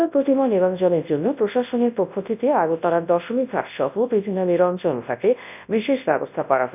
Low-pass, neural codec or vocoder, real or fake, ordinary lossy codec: 3.6 kHz; codec, 24 kHz, 0.9 kbps, WavTokenizer, large speech release; fake; none